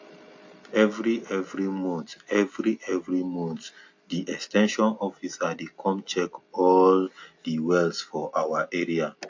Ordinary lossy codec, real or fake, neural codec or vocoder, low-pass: AAC, 48 kbps; real; none; 7.2 kHz